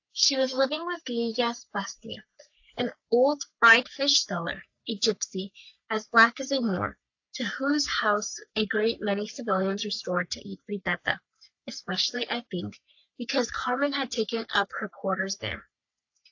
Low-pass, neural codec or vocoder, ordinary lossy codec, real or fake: 7.2 kHz; codec, 44.1 kHz, 3.4 kbps, Pupu-Codec; AAC, 48 kbps; fake